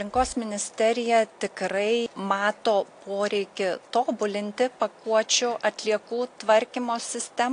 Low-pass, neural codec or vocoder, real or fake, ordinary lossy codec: 9.9 kHz; none; real; AAC, 48 kbps